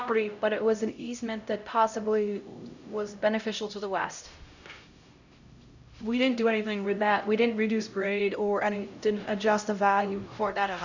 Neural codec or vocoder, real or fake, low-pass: codec, 16 kHz, 0.5 kbps, X-Codec, HuBERT features, trained on LibriSpeech; fake; 7.2 kHz